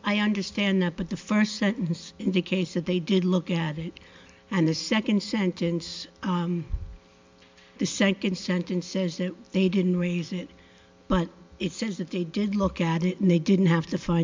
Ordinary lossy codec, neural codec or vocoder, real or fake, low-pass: MP3, 64 kbps; none; real; 7.2 kHz